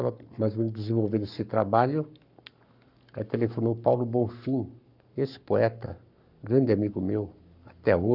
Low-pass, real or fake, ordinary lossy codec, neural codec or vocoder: 5.4 kHz; fake; none; codec, 44.1 kHz, 7.8 kbps, Pupu-Codec